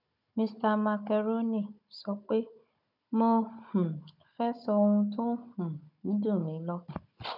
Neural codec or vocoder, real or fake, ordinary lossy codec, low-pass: codec, 16 kHz, 16 kbps, FunCodec, trained on Chinese and English, 50 frames a second; fake; none; 5.4 kHz